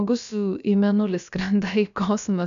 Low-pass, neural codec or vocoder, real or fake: 7.2 kHz; codec, 16 kHz, about 1 kbps, DyCAST, with the encoder's durations; fake